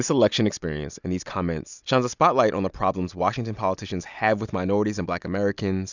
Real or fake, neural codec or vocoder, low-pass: real; none; 7.2 kHz